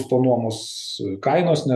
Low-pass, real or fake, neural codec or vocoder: 14.4 kHz; fake; vocoder, 48 kHz, 128 mel bands, Vocos